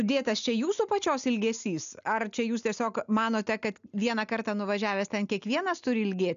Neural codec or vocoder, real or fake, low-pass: none; real; 7.2 kHz